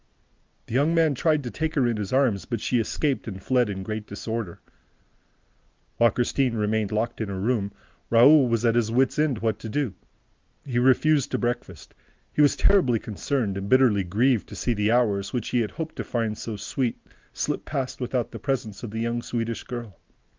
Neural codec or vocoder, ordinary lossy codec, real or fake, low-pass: none; Opus, 24 kbps; real; 7.2 kHz